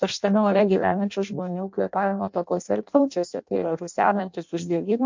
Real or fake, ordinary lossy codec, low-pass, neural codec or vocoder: fake; MP3, 64 kbps; 7.2 kHz; codec, 16 kHz in and 24 kHz out, 0.6 kbps, FireRedTTS-2 codec